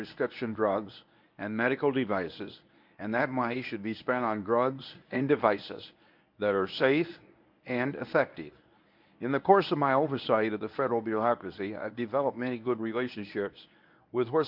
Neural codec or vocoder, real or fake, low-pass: codec, 24 kHz, 0.9 kbps, WavTokenizer, medium speech release version 1; fake; 5.4 kHz